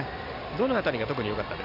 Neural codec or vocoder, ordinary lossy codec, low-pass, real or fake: none; none; 5.4 kHz; real